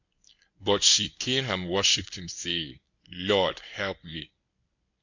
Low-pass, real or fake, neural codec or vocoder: 7.2 kHz; fake; codec, 24 kHz, 0.9 kbps, WavTokenizer, medium speech release version 1